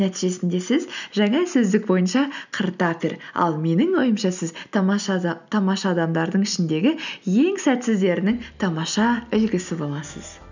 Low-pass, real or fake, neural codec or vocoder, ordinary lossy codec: 7.2 kHz; real; none; none